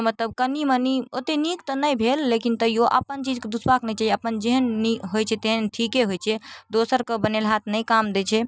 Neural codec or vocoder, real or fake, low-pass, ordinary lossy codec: none; real; none; none